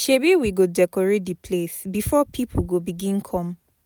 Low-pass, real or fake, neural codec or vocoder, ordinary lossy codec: none; real; none; none